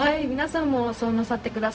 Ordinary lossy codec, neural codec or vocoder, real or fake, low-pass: none; codec, 16 kHz, 0.4 kbps, LongCat-Audio-Codec; fake; none